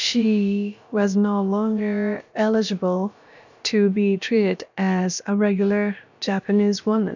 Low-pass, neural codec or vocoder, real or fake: 7.2 kHz; codec, 16 kHz, about 1 kbps, DyCAST, with the encoder's durations; fake